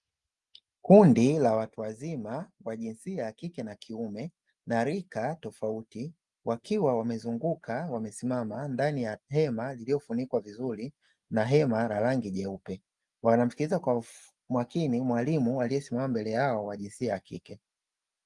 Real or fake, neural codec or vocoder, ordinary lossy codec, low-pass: real; none; Opus, 32 kbps; 10.8 kHz